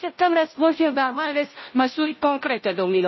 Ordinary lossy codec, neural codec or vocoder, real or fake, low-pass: MP3, 24 kbps; codec, 16 kHz, 0.5 kbps, FunCodec, trained on Chinese and English, 25 frames a second; fake; 7.2 kHz